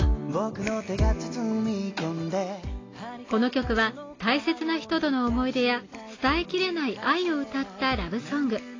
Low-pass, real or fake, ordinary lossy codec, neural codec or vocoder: 7.2 kHz; real; AAC, 32 kbps; none